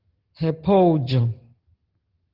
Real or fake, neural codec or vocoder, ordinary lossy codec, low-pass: real; none; Opus, 16 kbps; 5.4 kHz